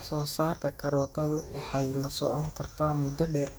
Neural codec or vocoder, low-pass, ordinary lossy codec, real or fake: codec, 44.1 kHz, 2.6 kbps, DAC; none; none; fake